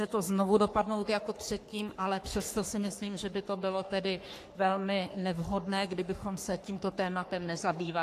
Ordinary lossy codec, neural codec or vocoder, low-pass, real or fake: AAC, 64 kbps; codec, 44.1 kHz, 3.4 kbps, Pupu-Codec; 14.4 kHz; fake